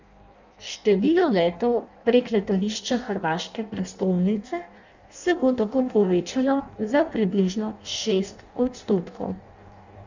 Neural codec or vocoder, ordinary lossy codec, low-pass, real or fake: codec, 16 kHz in and 24 kHz out, 0.6 kbps, FireRedTTS-2 codec; none; 7.2 kHz; fake